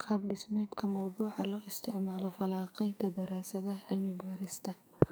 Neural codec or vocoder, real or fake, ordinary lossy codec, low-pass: codec, 44.1 kHz, 2.6 kbps, SNAC; fake; none; none